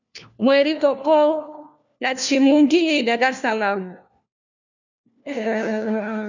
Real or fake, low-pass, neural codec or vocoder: fake; 7.2 kHz; codec, 16 kHz, 1 kbps, FunCodec, trained on LibriTTS, 50 frames a second